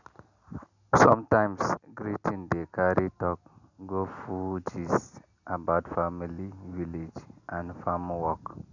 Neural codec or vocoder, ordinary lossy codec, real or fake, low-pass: none; none; real; 7.2 kHz